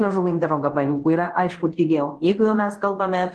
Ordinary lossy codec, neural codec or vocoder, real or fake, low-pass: Opus, 16 kbps; codec, 24 kHz, 0.5 kbps, DualCodec; fake; 10.8 kHz